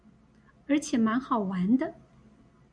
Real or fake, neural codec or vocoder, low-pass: real; none; 9.9 kHz